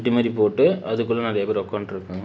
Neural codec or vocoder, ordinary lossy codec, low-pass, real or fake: none; none; none; real